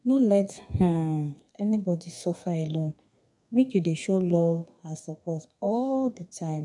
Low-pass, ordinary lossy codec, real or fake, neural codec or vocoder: 10.8 kHz; none; fake; codec, 32 kHz, 1.9 kbps, SNAC